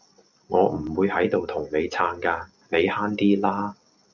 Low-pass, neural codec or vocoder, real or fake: 7.2 kHz; none; real